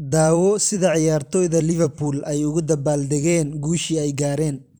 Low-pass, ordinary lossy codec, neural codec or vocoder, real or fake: none; none; vocoder, 44.1 kHz, 128 mel bands every 256 samples, BigVGAN v2; fake